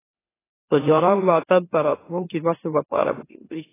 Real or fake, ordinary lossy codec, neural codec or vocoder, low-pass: fake; AAC, 16 kbps; autoencoder, 44.1 kHz, a latent of 192 numbers a frame, MeloTTS; 3.6 kHz